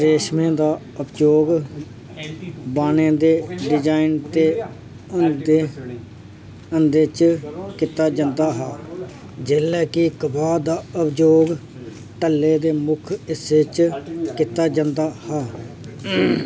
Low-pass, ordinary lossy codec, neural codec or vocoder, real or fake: none; none; none; real